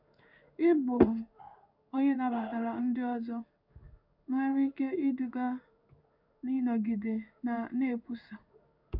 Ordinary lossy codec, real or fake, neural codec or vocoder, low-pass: none; fake; codec, 16 kHz in and 24 kHz out, 1 kbps, XY-Tokenizer; 5.4 kHz